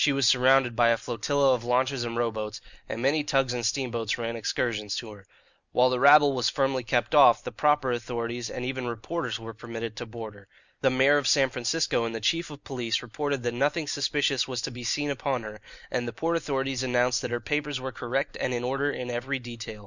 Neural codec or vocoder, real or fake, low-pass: none; real; 7.2 kHz